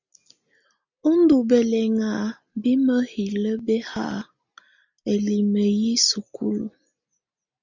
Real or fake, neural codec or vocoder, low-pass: real; none; 7.2 kHz